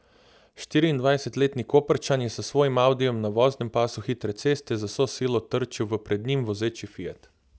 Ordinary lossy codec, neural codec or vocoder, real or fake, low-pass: none; none; real; none